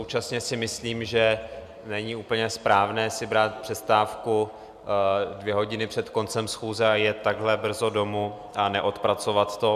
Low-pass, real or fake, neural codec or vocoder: 14.4 kHz; real; none